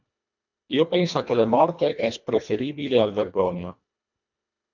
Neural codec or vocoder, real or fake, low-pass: codec, 24 kHz, 1.5 kbps, HILCodec; fake; 7.2 kHz